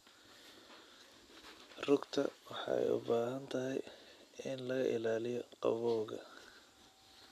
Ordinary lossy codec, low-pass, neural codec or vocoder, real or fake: none; 14.4 kHz; none; real